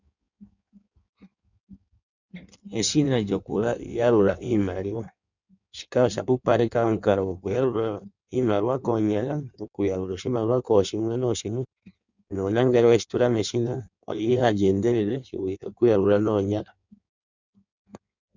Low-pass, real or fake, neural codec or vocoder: 7.2 kHz; fake; codec, 16 kHz in and 24 kHz out, 1.1 kbps, FireRedTTS-2 codec